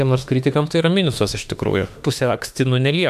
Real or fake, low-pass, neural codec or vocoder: fake; 14.4 kHz; autoencoder, 48 kHz, 32 numbers a frame, DAC-VAE, trained on Japanese speech